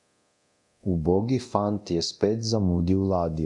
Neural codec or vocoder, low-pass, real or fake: codec, 24 kHz, 0.9 kbps, DualCodec; 10.8 kHz; fake